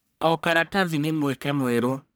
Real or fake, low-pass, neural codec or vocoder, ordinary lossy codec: fake; none; codec, 44.1 kHz, 1.7 kbps, Pupu-Codec; none